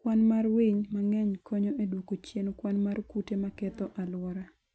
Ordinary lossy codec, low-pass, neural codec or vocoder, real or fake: none; none; none; real